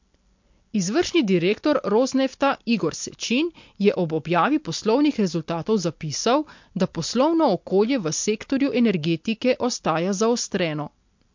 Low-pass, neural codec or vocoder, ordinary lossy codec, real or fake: 7.2 kHz; none; MP3, 48 kbps; real